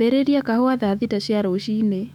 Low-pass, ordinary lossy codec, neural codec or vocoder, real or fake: 19.8 kHz; none; autoencoder, 48 kHz, 128 numbers a frame, DAC-VAE, trained on Japanese speech; fake